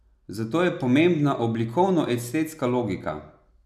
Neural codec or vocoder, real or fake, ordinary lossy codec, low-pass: none; real; none; 14.4 kHz